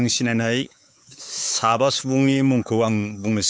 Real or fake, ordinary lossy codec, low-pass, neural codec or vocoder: fake; none; none; codec, 16 kHz, 4 kbps, X-Codec, WavLM features, trained on Multilingual LibriSpeech